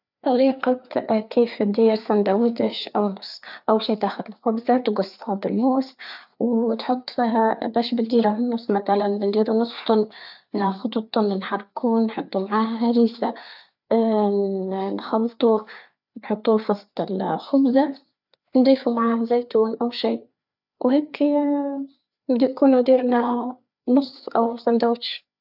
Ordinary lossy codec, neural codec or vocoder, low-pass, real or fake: none; codec, 16 kHz, 4 kbps, FreqCodec, larger model; 5.4 kHz; fake